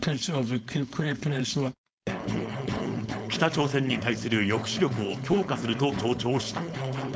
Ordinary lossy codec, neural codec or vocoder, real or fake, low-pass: none; codec, 16 kHz, 4.8 kbps, FACodec; fake; none